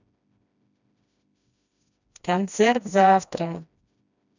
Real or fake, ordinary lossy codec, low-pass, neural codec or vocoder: fake; none; 7.2 kHz; codec, 16 kHz, 1 kbps, FreqCodec, smaller model